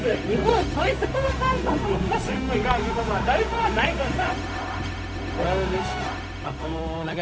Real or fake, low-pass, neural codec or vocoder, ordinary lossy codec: fake; none; codec, 16 kHz, 0.4 kbps, LongCat-Audio-Codec; none